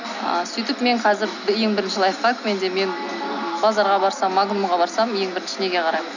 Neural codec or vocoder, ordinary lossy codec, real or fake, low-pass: none; none; real; 7.2 kHz